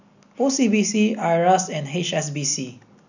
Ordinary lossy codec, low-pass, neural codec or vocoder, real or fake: none; 7.2 kHz; none; real